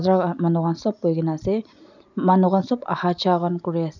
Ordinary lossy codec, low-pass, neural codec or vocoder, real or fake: none; 7.2 kHz; none; real